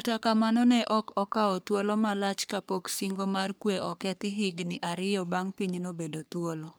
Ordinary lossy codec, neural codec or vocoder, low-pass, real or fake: none; codec, 44.1 kHz, 3.4 kbps, Pupu-Codec; none; fake